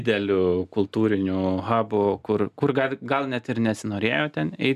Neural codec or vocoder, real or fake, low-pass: none; real; 14.4 kHz